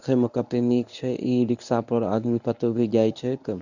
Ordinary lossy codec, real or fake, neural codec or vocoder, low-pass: none; fake; codec, 24 kHz, 0.9 kbps, WavTokenizer, medium speech release version 1; 7.2 kHz